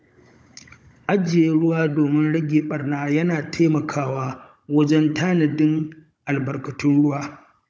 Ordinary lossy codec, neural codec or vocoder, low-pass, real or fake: none; codec, 16 kHz, 16 kbps, FunCodec, trained on Chinese and English, 50 frames a second; none; fake